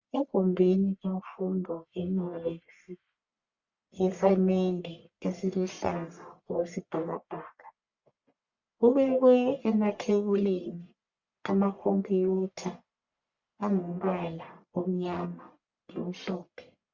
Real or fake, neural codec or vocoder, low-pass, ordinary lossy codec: fake; codec, 44.1 kHz, 1.7 kbps, Pupu-Codec; 7.2 kHz; Opus, 64 kbps